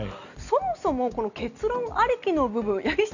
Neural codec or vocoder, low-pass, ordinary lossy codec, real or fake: none; 7.2 kHz; none; real